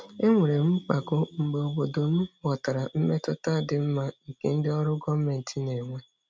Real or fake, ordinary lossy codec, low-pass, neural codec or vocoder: real; none; none; none